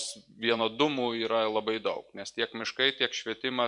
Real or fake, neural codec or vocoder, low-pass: fake; vocoder, 44.1 kHz, 128 mel bands every 256 samples, BigVGAN v2; 10.8 kHz